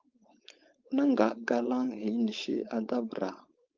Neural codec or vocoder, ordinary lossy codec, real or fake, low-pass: codec, 16 kHz, 4.8 kbps, FACodec; Opus, 24 kbps; fake; 7.2 kHz